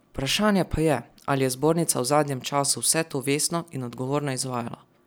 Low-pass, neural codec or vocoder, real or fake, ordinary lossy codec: none; none; real; none